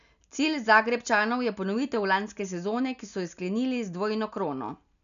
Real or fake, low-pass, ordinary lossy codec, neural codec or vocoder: real; 7.2 kHz; none; none